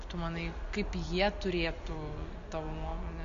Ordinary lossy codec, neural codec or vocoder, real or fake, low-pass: Opus, 64 kbps; none; real; 7.2 kHz